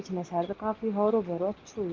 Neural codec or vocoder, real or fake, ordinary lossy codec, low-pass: none; real; Opus, 16 kbps; 7.2 kHz